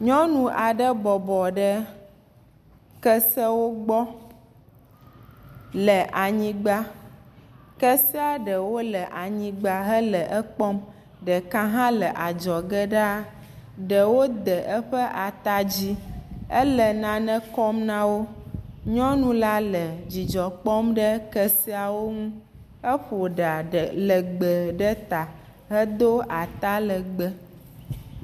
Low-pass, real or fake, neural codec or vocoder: 14.4 kHz; real; none